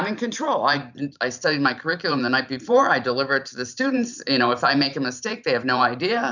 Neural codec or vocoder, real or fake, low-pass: vocoder, 44.1 kHz, 128 mel bands every 512 samples, BigVGAN v2; fake; 7.2 kHz